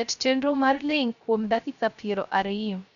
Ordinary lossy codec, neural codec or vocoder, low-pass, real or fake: MP3, 96 kbps; codec, 16 kHz, 0.3 kbps, FocalCodec; 7.2 kHz; fake